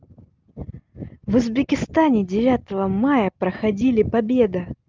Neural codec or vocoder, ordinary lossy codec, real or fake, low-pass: none; Opus, 24 kbps; real; 7.2 kHz